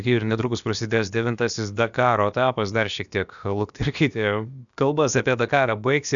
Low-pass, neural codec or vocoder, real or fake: 7.2 kHz; codec, 16 kHz, about 1 kbps, DyCAST, with the encoder's durations; fake